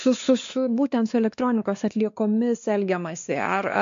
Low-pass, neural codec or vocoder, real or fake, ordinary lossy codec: 7.2 kHz; codec, 16 kHz, 2 kbps, X-Codec, WavLM features, trained on Multilingual LibriSpeech; fake; MP3, 48 kbps